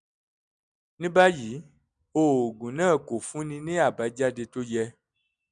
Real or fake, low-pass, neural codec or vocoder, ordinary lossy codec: real; 9.9 kHz; none; none